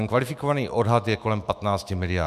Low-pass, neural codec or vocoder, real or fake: 14.4 kHz; autoencoder, 48 kHz, 128 numbers a frame, DAC-VAE, trained on Japanese speech; fake